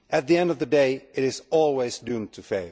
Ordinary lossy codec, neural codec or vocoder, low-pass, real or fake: none; none; none; real